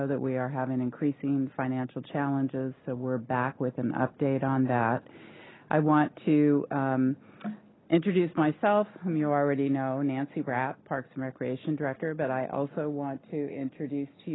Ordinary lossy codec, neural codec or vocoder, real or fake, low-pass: AAC, 16 kbps; none; real; 7.2 kHz